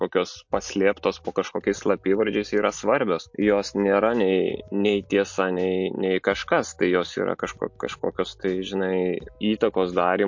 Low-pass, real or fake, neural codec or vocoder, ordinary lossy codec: 7.2 kHz; real; none; MP3, 48 kbps